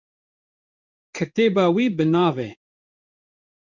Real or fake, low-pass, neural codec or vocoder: fake; 7.2 kHz; codec, 16 kHz in and 24 kHz out, 1 kbps, XY-Tokenizer